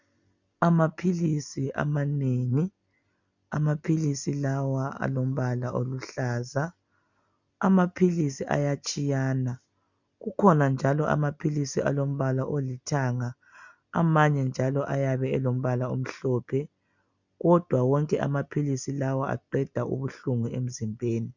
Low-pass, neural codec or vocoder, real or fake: 7.2 kHz; none; real